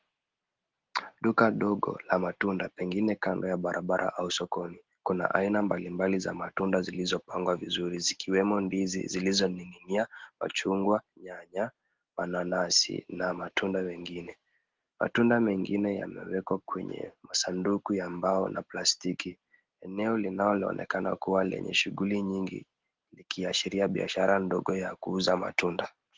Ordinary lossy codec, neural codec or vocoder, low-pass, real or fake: Opus, 16 kbps; none; 7.2 kHz; real